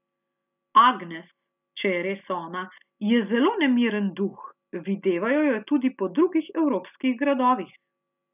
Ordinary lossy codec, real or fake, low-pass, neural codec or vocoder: none; real; 3.6 kHz; none